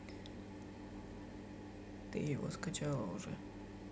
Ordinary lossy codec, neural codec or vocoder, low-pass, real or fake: none; none; none; real